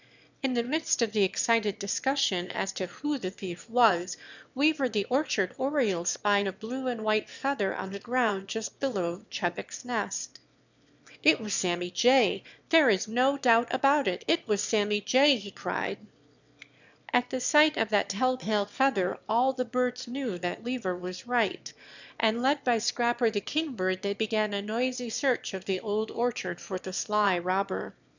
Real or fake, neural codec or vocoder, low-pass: fake; autoencoder, 22.05 kHz, a latent of 192 numbers a frame, VITS, trained on one speaker; 7.2 kHz